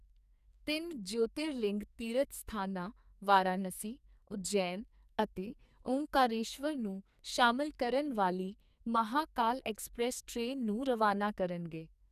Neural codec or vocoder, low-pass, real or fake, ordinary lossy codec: codec, 44.1 kHz, 2.6 kbps, SNAC; 14.4 kHz; fake; none